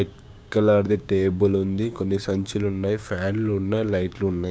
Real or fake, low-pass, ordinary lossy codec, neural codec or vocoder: fake; none; none; codec, 16 kHz, 6 kbps, DAC